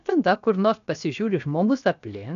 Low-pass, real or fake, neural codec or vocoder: 7.2 kHz; fake; codec, 16 kHz, 0.7 kbps, FocalCodec